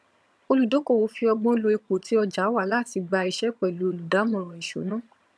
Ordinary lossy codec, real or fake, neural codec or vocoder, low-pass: none; fake; vocoder, 22.05 kHz, 80 mel bands, HiFi-GAN; none